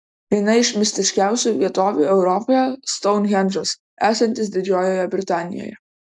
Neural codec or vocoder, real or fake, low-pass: none; real; 10.8 kHz